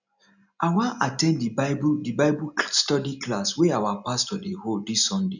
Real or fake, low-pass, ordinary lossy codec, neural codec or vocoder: real; 7.2 kHz; none; none